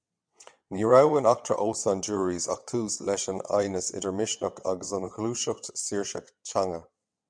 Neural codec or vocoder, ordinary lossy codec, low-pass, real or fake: vocoder, 22.05 kHz, 80 mel bands, WaveNeXt; MP3, 96 kbps; 9.9 kHz; fake